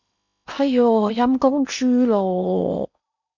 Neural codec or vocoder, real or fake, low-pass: codec, 16 kHz in and 24 kHz out, 0.8 kbps, FocalCodec, streaming, 65536 codes; fake; 7.2 kHz